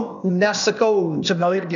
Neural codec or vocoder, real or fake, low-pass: codec, 16 kHz, 0.8 kbps, ZipCodec; fake; 7.2 kHz